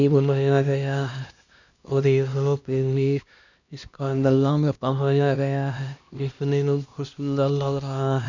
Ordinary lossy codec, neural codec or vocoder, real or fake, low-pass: none; codec, 16 kHz, 1 kbps, X-Codec, HuBERT features, trained on LibriSpeech; fake; 7.2 kHz